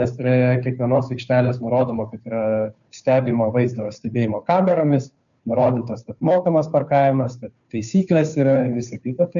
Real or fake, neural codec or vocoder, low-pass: fake; codec, 16 kHz, 2 kbps, FunCodec, trained on Chinese and English, 25 frames a second; 7.2 kHz